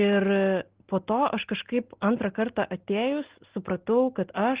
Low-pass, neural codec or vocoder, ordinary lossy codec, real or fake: 3.6 kHz; none; Opus, 16 kbps; real